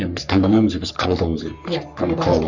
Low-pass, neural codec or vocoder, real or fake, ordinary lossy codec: 7.2 kHz; codec, 44.1 kHz, 3.4 kbps, Pupu-Codec; fake; none